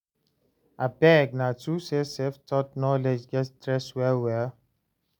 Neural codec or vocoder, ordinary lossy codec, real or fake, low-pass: none; none; real; none